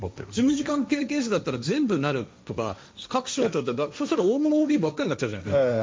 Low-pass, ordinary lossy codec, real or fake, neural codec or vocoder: none; none; fake; codec, 16 kHz, 1.1 kbps, Voila-Tokenizer